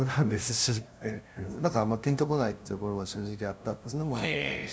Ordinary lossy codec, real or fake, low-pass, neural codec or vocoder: none; fake; none; codec, 16 kHz, 0.5 kbps, FunCodec, trained on LibriTTS, 25 frames a second